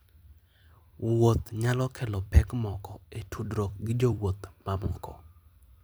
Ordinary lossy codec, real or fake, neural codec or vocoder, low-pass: none; fake; vocoder, 44.1 kHz, 128 mel bands every 256 samples, BigVGAN v2; none